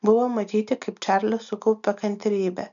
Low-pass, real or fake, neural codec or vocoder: 7.2 kHz; real; none